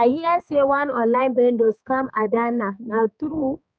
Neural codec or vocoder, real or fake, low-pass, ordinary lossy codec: codec, 16 kHz, 4 kbps, X-Codec, HuBERT features, trained on balanced general audio; fake; none; none